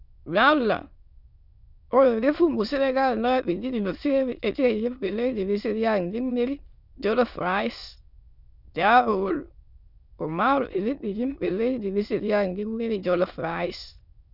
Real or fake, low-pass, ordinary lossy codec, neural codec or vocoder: fake; 5.4 kHz; none; autoencoder, 22.05 kHz, a latent of 192 numbers a frame, VITS, trained on many speakers